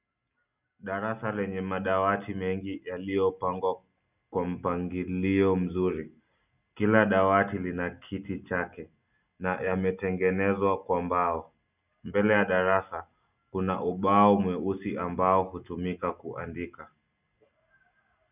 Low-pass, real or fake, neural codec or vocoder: 3.6 kHz; real; none